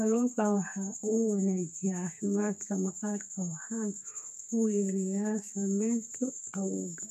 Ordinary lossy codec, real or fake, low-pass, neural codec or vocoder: none; fake; 14.4 kHz; codec, 32 kHz, 1.9 kbps, SNAC